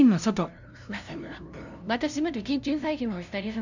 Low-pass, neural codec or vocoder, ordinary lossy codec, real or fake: 7.2 kHz; codec, 16 kHz, 0.5 kbps, FunCodec, trained on LibriTTS, 25 frames a second; none; fake